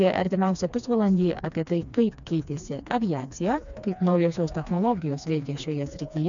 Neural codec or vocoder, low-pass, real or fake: codec, 16 kHz, 2 kbps, FreqCodec, smaller model; 7.2 kHz; fake